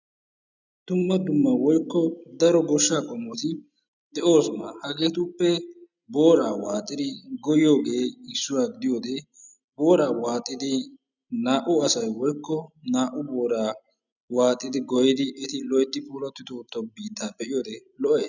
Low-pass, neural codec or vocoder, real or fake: 7.2 kHz; none; real